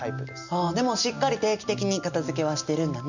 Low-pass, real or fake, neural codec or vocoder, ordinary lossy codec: 7.2 kHz; real; none; none